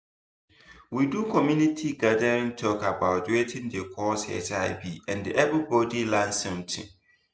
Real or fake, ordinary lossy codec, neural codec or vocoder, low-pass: real; none; none; none